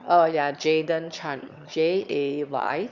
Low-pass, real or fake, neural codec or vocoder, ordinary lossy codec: 7.2 kHz; fake; autoencoder, 22.05 kHz, a latent of 192 numbers a frame, VITS, trained on one speaker; Opus, 64 kbps